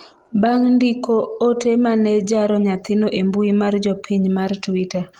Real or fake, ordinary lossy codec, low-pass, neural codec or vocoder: real; Opus, 24 kbps; 19.8 kHz; none